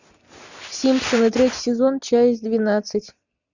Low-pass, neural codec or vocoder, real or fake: 7.2 kHz; none; real